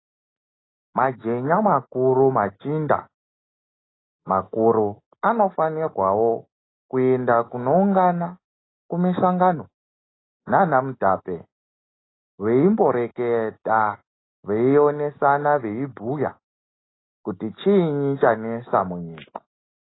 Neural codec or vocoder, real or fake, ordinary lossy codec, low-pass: none; real; AAC, 16 kbps; 7.2 kHz